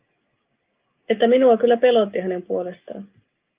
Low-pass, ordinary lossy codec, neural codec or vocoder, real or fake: 3.6 kHz; Opus, 24 kbps; none; real